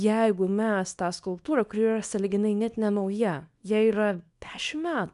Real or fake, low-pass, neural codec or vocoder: fake; 10.8 kHz; codec, 24 kHz, 0.9 kbps, WavTokenizer, small release